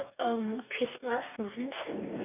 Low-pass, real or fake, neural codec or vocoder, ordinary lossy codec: 3.6 kHz; fake; codec, 44.1 kHz, 2.6 kbps, DAC; none